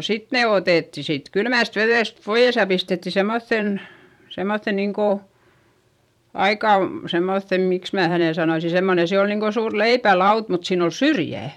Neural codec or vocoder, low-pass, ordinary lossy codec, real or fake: vocoder, 44.1 kHz, 128 mel bands every 512 samples, BigVGAN v2; 19.8 kHz; none; fake